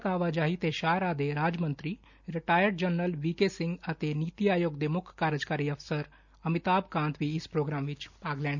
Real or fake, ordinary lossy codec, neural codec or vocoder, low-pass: real; none; none; 7.2 kHz